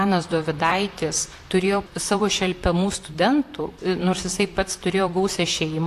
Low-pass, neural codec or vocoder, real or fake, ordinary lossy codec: 14.4 kHz; vocoder, 44.1 kHz, 128 mel bands, Pupu-Vocoder; fake; AAC, 64 kbps